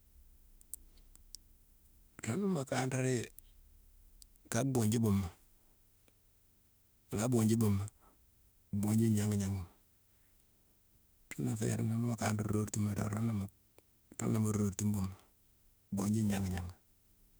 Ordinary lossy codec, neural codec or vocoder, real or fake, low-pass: none; autoencoder, 48 kHz, 32 numbers a frame, DAC-VAE, trained on Japanese speech; fake; none